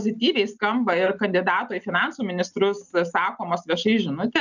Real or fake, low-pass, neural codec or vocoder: real; 7.2 kHz; none